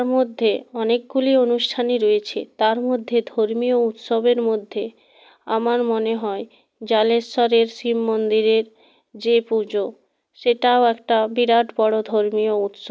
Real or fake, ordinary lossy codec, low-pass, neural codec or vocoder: real; none; none; none